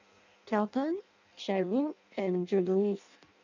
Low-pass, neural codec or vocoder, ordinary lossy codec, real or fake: 7.2 kHz; codec, 16 kHz in and 24 kHz out, 0.6 kbps, FireRedTTS-2 codec; none; fake